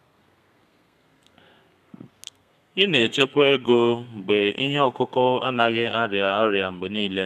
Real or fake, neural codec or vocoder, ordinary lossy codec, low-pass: fake; codec, 32 kHz, 1.9 kbps, SNAC; none; 14.4 kHz